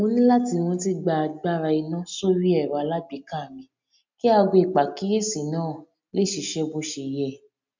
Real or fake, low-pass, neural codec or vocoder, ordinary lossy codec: real; 7.2 kHz; none; MP3, 64 kbps